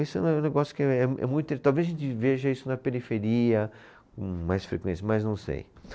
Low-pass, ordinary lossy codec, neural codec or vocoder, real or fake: none; none; none; real